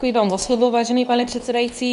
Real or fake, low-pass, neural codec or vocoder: fake; 10.8 kHz; codec, 24 kHz, 0.9 kbps, WavTokenizer, medium speech release version 2